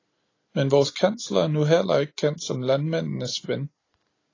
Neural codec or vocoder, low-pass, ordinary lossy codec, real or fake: none; 7.2 kHz; AAC, 32 kbps; real